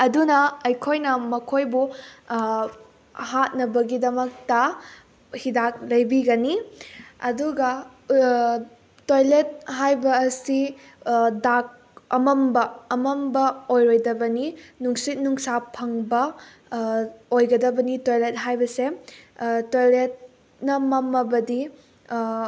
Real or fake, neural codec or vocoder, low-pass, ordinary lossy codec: real; none; none; none